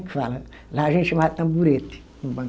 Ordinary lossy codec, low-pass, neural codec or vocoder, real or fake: none; none; none; real